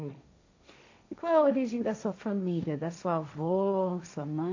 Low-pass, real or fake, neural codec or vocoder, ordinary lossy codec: 7.2 kHz; fake; codec, 16 kHz, 1.1 kbps, Voila-Tokenizer; AAC, 48 kbps